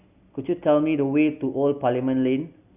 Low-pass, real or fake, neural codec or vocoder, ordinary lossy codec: 3.6 kHz; real; none; Opus, 64 kbps